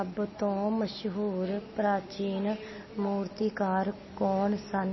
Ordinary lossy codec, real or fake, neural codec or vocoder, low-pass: MP3, 24 kbps; real; none; 7.2 kHz